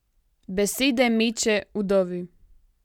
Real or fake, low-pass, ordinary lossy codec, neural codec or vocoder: real; 19.8 kHz; none; none